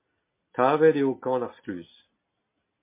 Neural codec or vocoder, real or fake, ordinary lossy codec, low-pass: none; real; MP3, 24 kbps; 3.6 kHz